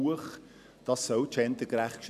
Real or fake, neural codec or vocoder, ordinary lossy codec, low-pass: real; none; none; 14.4 kHz